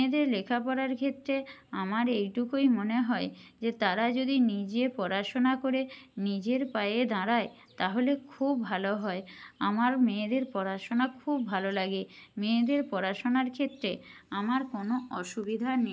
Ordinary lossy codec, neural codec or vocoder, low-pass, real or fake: none; none; none; real